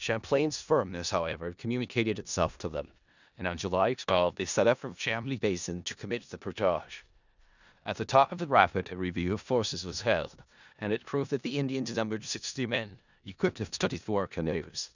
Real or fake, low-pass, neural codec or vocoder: fake; 7.2 kHz; codec, 16 kHz in and 24 kHz out, 0.4 kbps, LongCat-Audio-Codec, four codebook decoder